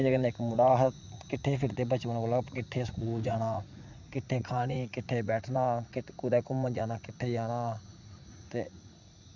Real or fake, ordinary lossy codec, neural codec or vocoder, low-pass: fake; none; vocoder, 44.1 kHz, 80 mel bands, Vocos; 7.2 kHz